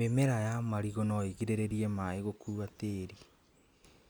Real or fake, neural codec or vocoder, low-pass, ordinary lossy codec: real; none; none; none